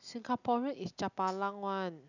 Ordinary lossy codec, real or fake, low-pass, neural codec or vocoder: none; real; 7.2 kHz; none